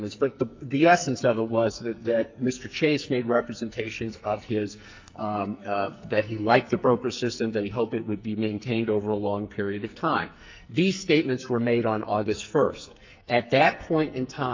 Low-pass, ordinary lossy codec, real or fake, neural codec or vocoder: 7.2 kHz; MP3, 64 kbps; fake; codec, 44.1 kHz, 2.6 kbps, SNAC